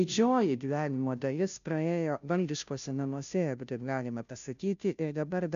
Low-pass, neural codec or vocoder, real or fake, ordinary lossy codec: 7.2 kHz; codec, 16 kHz, 0.5 kbps, FunCodec, trained on Chinese and English, 25 frames a second; fake; MP3, 96 kbps